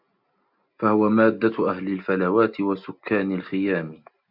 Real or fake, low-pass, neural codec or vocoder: real; 5.4 kHz; none